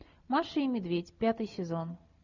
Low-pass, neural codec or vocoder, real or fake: 7.2 kHz; none; real